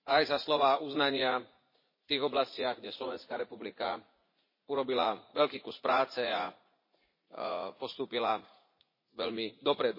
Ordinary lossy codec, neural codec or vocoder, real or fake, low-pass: MP3, 24 kbps; vocoder, 44.1 kHz, 80 mel bands, Vocos; fake; 5.4 kHz